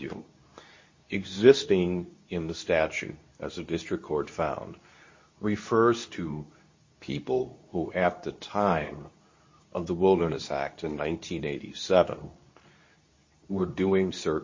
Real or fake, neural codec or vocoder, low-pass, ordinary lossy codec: fake; codec, 24 kHz, 0.9 kbps, WavTokenizer, medium speech release version 2; 7.2 kHz; MP3, 32 kbps